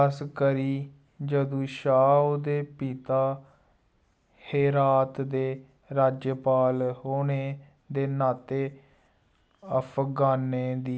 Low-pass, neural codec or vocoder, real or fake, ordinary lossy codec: none; none; real; none